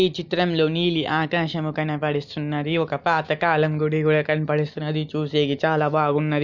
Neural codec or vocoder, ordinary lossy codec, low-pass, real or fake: none; none; 7.2 kHz; real